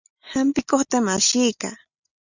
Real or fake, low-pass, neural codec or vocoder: real; 7.2 kHz; none